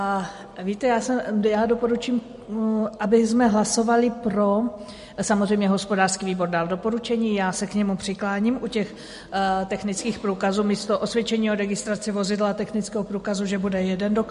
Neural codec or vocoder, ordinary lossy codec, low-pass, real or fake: none; MP3, 48 kbps; 10.8 kHz; real